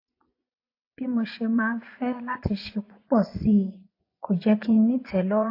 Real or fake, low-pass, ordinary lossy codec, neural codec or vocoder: fake; 5.4 kHz; AAC, 32 kbps; vocoder, 24 kHz, 100 mel bands, Vocos